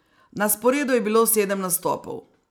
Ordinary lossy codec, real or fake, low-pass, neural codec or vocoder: none; real; none; none